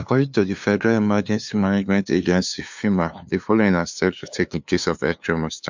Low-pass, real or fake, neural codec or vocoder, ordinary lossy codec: 7.2 kHz; fake; codec, 16 kHz, 2 kbps, FunCodec, trained on LibriTTS, 25 frames a second; MP3, 64 kbps